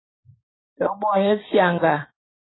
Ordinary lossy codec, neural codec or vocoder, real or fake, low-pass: AAC, 16 kbps; vocoder, 44.1 kHz, 128 mel bands every 512 samples, BigVGAN v2; fake; 7.2 kHz